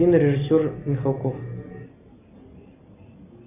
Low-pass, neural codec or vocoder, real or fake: 3.6 kHz; none; real